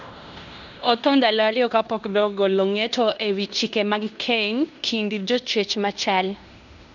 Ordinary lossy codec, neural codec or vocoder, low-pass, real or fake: none; codec, 16 kHz in and 24 kHz out, 0.9 kbps, LongCat-Audio-Codec, fine tuned four codebook decoder; 7.2 kHz; fake